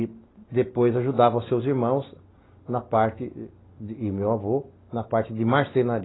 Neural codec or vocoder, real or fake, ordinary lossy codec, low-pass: none; real; AAC, 16 kbps; 7.2 kHz